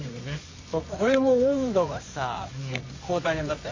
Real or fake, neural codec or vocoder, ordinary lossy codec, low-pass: fake; codec, 24 kHz, 0.9 kbps, WavTokenizer, medium music audio release; MP3, 32 kbps; 7.2 kHz